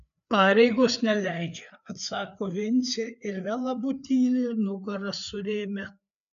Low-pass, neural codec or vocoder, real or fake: 7.2 kHz; codec, 16 kHz, 4 kbps, FreqCodec, larger model; fake